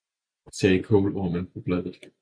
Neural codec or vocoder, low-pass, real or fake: none; 9.9 kHz; real